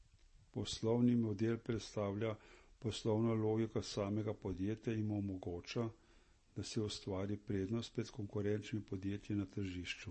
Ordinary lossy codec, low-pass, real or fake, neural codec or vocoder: MP3, 32 kbps; 9.9 kHz; real; none